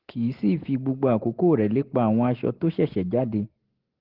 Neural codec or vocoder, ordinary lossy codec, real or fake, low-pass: none; Opus, 16 kbps; real; 5.4 kHz